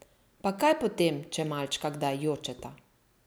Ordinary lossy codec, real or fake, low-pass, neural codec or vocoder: none; real; none; none